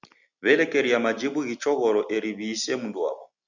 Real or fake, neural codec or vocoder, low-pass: real; none; 7.2 kHz